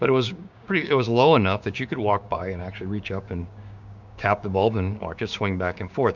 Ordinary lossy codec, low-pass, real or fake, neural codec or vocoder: MP3, 64 kbps; 7.2 kHz; fake; codec, 16 kHz, 6 kbps, DAC